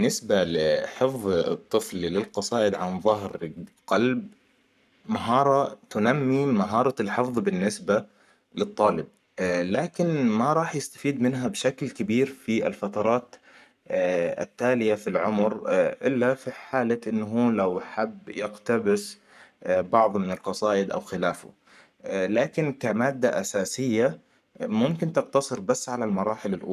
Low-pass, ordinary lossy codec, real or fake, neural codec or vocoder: 14.4 kHz; none; fake; codec, 44.1 kHz, 7.8 kbps, Pupu-Codec